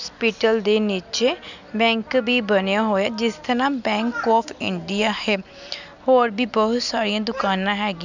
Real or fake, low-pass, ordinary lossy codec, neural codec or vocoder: real; 7.2 kHz; none; none